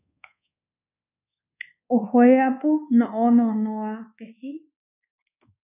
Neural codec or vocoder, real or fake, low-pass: codec, 24 kHz, 1.2 kbps, DualCodec; fake; 3.6 kHz